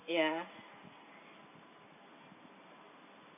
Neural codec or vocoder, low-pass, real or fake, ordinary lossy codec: none; 3.6 kHz; real; none